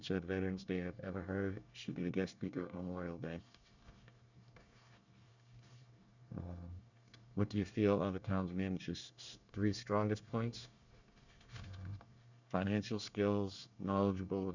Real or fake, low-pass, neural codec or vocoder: fake; 7.2 kHz; codec, 24 kHz, 1 kbps, SNAC